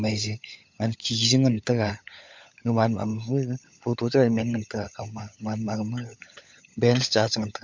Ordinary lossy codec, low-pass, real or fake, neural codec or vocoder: MP3, 64 kbps; 7.2 kHz; fake; codec, 16 kHz, 16 kbps, FunCodec, trained on LibriTTS, 50 frames a second